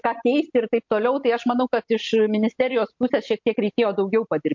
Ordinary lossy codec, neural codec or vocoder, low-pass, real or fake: MP3, 48 kbps; none; 7.2 kHz; real